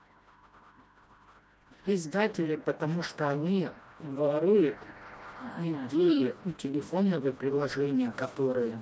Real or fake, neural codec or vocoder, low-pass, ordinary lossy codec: fake; codec, 16 kHz, 1 kbps, FreqCodec, smaller model; none; none